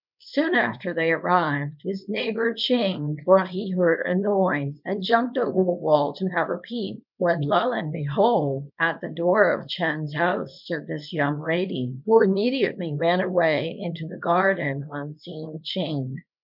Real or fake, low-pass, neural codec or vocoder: fake; 5.4 kHz; codec, 24 kHz, 0.9 kbps, WavTokenizer, small release